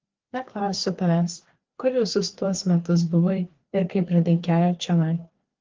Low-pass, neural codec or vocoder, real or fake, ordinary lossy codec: 7.2 kHz; codec, 16 kHz, 2 kbps, FreqCodec, larger model; fake; Opus, 16 kbps